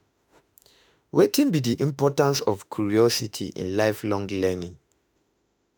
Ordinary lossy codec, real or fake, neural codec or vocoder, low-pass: none; fake; autoencoder, 48 kHz, 32 numbers a frame, DAC-VAE, trained on Japanese speech; none